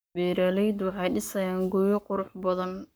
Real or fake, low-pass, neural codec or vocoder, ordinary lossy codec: fake; none; codec, 44.1 kHz, 7.8 kbps, Pupu-Codec; none